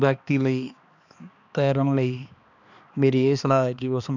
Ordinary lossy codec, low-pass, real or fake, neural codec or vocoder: none; 7.2 kHz; fake; codec, 16 kHz, 2 kbps, X-Codec, HuBERT features, trained on balanced general audio